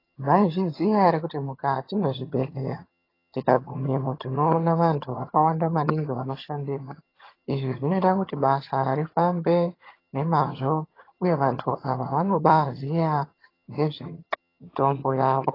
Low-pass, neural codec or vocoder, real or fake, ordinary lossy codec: 5.4 kHz; vocoder, 22.05 kHz, 80 mel bands, HiFi-GAN; fake; AAC, 24 kbps